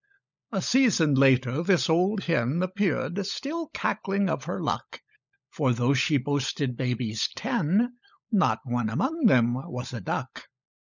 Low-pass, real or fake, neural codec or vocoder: 7.2 kHz; fake; codec, 16 kHz, 16 kbps, FunCodec, trained on LibriTTS, 50 frames a second